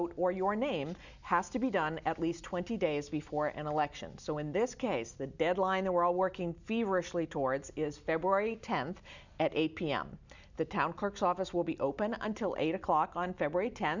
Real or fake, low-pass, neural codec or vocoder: real; 7.2 kHz; none